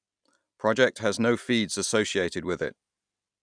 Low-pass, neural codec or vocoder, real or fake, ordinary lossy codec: 9.9 kHz; none; real; none